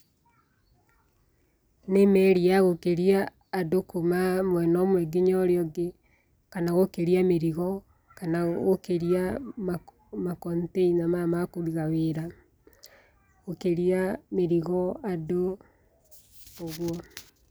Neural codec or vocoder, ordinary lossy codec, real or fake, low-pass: none; none; real; none